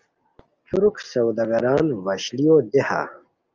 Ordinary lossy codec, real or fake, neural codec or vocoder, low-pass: Opus, 24 kbps; real; none; 7.2 kHz